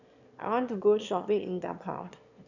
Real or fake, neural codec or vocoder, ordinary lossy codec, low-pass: fake; autoencoder, 22.05 kHz, a latent of 192 numbers a frame, VITS, trained on one speaker; none; 7.2 kHz